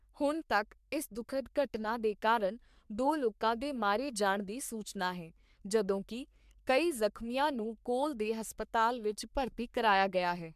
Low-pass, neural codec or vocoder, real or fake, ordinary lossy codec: 14.4 kHz; codec, 44.1 kHz, 3.4 kbps, Pupu-Codec; fake; MP3, 96 kbps